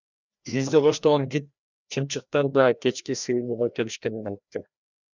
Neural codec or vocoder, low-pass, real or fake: codec, 16 kHz, 1 kbps, FreqCodec, larger model; 7.2 kHz; fake